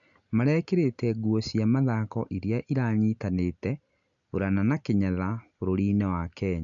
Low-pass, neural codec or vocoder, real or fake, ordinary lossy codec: 7.2 kHz; none; real; none